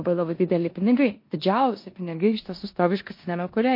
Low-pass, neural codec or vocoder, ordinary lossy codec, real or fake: 5.4 kHz; codec, 16 kHz in and 24 kHz out, 0.9 kbps, LongCat-Audio-Codec, four codebook decoder; MP3, 32 kbps; fake